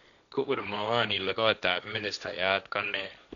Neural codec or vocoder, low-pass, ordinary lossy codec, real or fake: codec, 16 kHz, 1.1 kbps, Voila-Tokenizer; 7.2 kHz; none; fake